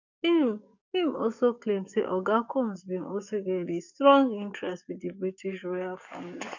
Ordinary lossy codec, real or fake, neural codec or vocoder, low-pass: none; fake; codec, 44.1 kHz, 7.8 kbps, DAC; 7.2 kHz